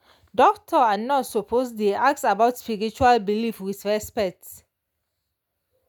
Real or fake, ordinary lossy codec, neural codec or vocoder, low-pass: real; none; none; none